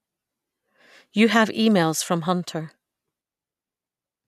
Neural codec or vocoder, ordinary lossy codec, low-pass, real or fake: none; none; 14.4 kHz; real